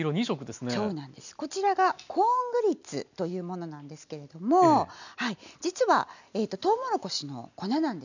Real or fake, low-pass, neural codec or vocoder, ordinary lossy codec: real; 7.2 kHz; none; none